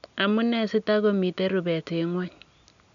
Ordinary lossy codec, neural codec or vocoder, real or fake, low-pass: none; none; real; 7.2 kHz